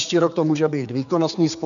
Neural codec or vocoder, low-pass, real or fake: codec, 16 kHz, 4 kbps, X-Codec, HuBERT features, trained on general audio; 7.2 kHz; fake